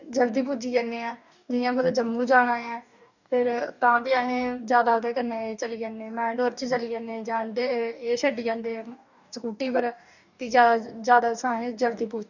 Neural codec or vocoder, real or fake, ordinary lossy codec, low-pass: codec, 44.1 kHz, 2.6 kbps, DAC; fake; none; 7.2 kHz